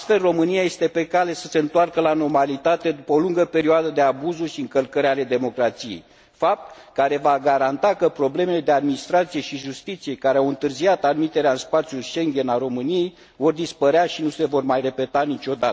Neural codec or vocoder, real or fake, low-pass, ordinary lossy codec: none; real; none; none